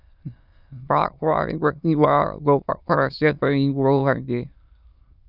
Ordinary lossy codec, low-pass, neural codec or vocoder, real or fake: Opus, 64 kbps; 5.4 kHz; autoencoder, 22.05 kHz, a latent of 192 numbers a frame, VITS, trained on many speakers; fake